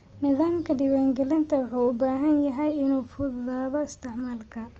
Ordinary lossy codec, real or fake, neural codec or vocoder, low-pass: Opus, 24 kbps; real; none; 7.2 kHz